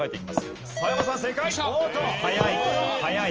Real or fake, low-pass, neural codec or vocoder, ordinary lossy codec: real; 7.2 kHz; none; Opus, 24 kbps